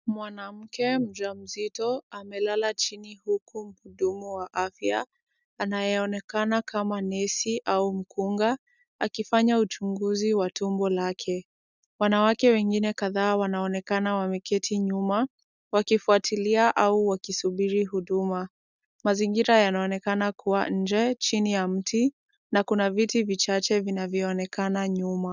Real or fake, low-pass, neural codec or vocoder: real; 7.2 kHz; none